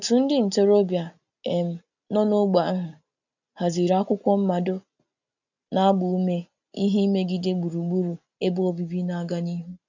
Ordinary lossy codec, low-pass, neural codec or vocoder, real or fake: none; 7.2 kHz; none; real